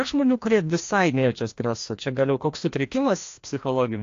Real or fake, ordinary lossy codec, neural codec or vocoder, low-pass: fake; AAC, 48 kbps; codec, 16 kHz, 1 kbps, FreqCodec, larger model; 7.2 kHz